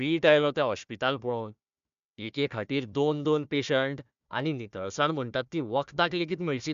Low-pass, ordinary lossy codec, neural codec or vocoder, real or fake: 7.2 kHz; AAC, 96 kbps; codec, 16 kHz, 1 kbps, FunCodec, trained on Chinese and English, 50 frames a second; fake